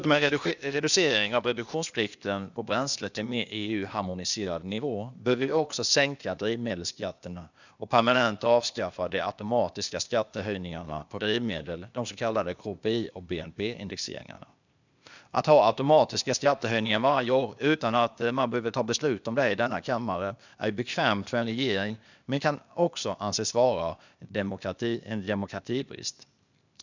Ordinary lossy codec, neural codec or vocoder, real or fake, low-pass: none; codec, 16 kHz, 0.8 kbps, ZipCodec; fake; 7.2 kHz